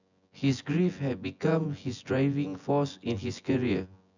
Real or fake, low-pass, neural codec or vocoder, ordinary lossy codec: fake; 7.2 kHz; vocoder, 24 kHz, 100 mel bands, Vocos; none